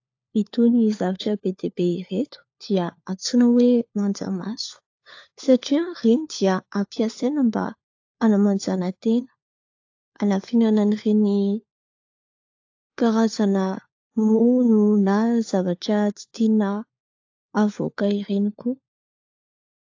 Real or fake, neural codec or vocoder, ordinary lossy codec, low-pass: fake; codec, 16 kHz, 4 kbps, FunCodec, trained on LibriTTS, 50 frames a second; AAC, 48 kbps; 7.2 kHz